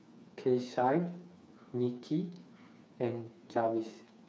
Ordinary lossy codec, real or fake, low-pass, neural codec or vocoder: none; fake; none; codec, 16 kHz, 4 kbps, FreqCodec, smaller model